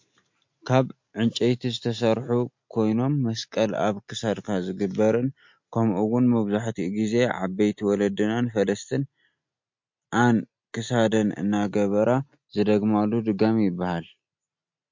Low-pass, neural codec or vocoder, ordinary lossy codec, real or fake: 7.2 kHz; none; MP3, 48 kbps; real